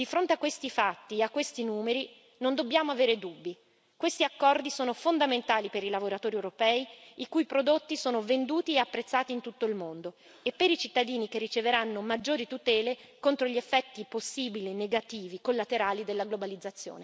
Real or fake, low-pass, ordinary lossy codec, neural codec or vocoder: real; none; none; none